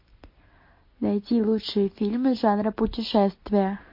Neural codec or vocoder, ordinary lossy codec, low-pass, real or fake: none; MP3, 32 kbps; 5.4 kHz; real